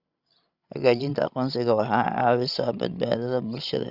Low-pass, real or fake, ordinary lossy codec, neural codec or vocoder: 5.4 kHz; fake; Opus, 64 kbps; codec, 16 kHz, 16 kbps, FreqCodec, larger model